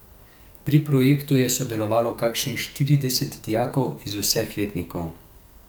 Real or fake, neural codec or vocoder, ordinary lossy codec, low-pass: fake; codec, 44.1 kHz, 2.6 kbps, SNAC; none; none